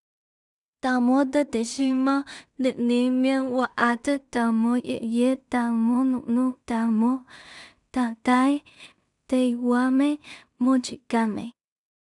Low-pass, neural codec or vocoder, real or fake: 10.8 kHz; codec, 16 kHz in and 24 kHz out, 0.4 kbps, LongCat-Audio-Codec, two codebook decoder; fake